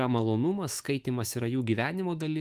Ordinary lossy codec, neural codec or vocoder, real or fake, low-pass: Opus, 24 kbps; autoencoder, 48 kHz, 128 numbers a frame, DAC-VAE, trained on Japanese speech; fake; 14.4 kHz